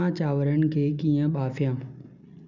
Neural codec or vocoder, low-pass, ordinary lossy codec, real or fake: none; 7.2 kHz; none; real